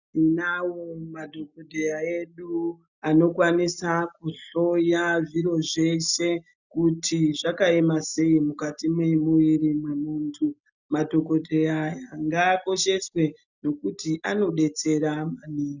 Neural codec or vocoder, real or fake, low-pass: none; real; 7.2 kHz